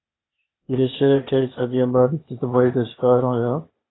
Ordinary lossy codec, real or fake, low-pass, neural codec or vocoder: AAC, 16 kbps; fake; 7.2 kHz; codec, 16 kHz, 0.8 kbps, ZipCodec